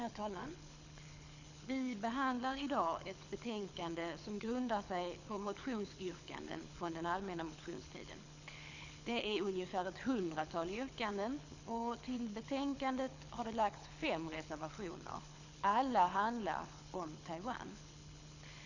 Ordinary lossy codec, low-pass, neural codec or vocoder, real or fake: none; 7.2 kHz; codec, 24 kHz, 6 kbps, HILCodec; fake